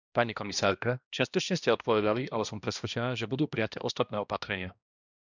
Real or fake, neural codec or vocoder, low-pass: fake; codec, 16 kHz, 1 kbps, X-Codec, HuBERT features, trained on balanced general audio; 7.2 kHz